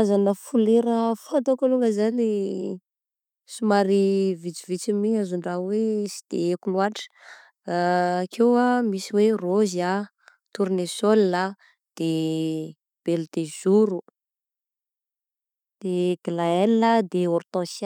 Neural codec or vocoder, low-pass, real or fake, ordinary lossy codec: none; 19.8 kHz; real; none